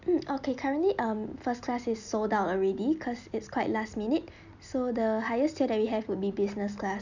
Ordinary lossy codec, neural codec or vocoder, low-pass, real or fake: none; none; 7.2 kHz; real